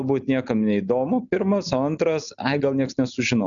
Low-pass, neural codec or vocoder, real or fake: 7.2 kHz; none; real